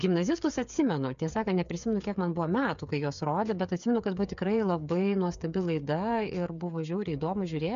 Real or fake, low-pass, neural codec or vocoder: fake; 7.2 kHz; codec, 16 kHz, 8 kbps, FreqCodec, smaller model